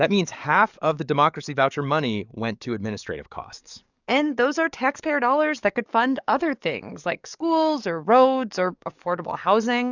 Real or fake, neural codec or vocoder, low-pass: fake; codec, 44.1 kHz, 7.8 kbps, DAC; 7.2 kHz